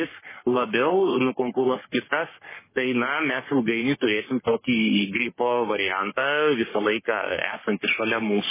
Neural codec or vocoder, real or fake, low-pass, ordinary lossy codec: codec, 44.1 kHz, 3.4 kbps, Pupu-Codec; fake; 3.6 kHz; MP3, 16 kbps